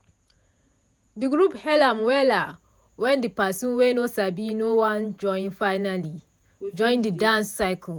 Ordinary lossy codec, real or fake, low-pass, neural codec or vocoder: none; fake; none; vocoder, 48 kHz, 128 mel bands, Vocos